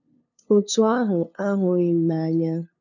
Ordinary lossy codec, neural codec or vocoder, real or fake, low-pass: none; codec, 16 kHz, 2 kbps, FunCodec, trained on LibriTTS, 25 frames a second; fake; 7.2 kHz